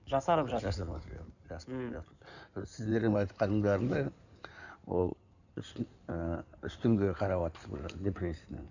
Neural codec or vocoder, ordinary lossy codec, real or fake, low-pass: codec, 16 kHz in and 24 kHz out, 2.2 kbps, FireRedTTS-2 codec; none; fake; 7.2 kHz